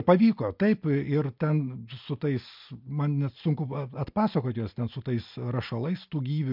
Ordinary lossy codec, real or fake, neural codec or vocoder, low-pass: MP3, 48 kbps; real; none; 5.4 kHz